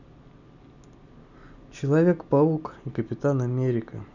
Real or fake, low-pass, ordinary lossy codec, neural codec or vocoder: real; 7.2 kHz; none; none